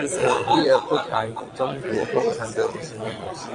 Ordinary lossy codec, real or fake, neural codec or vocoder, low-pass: AAC, 48 kbps; fake; vocoder, 22.05 kHz, 80 mel bands, Vocos; 9.9 kHz